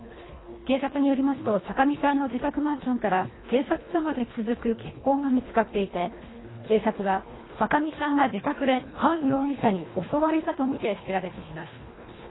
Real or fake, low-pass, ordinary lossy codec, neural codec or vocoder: fake; 7.2 kHz; AAC, 16 kbps; codec, 24 kHz, 1.5 kbps, HILCodec